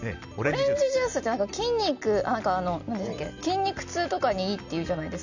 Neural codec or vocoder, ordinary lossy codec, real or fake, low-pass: none; none; real; 7.2 kHz